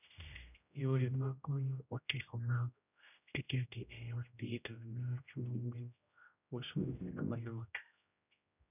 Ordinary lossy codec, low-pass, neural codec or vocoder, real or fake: none; 3.6 kHz; codec, 16 kHz, 0.5 kbps, X-Codec, HuBERT features, trained on general audio; fake